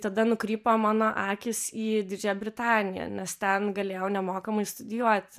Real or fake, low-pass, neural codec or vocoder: fake; 14.4 kHz; vocoder, 44.1 kHz, 128 mel bands every 512 samples, BigVGAN v2